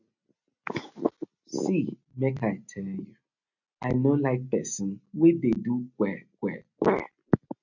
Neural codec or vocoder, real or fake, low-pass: none; real; 7.2 kHz